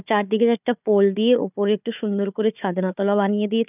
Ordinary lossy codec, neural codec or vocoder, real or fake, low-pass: none; codec, 16 kHz, 4 kbps, FunCodec, trained on Chinese and English, 50 frames a second; fake; 3.6 kHz